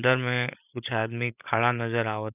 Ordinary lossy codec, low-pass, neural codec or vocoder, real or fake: none; 3.6 kHz; codec, 16 kHz, 16 kbps, FreqCodec, larger model; fake